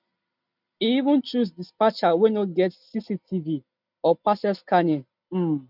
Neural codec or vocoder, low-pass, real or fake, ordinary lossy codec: none; 5.4 kHz; real; none